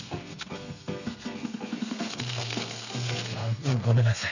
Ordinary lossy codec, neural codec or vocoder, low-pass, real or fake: none; codec, 32 kHz, 1.9 kbps, SNAC; 7.2 kHz; fake